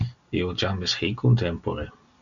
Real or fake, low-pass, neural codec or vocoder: real; 7.2 kHz; none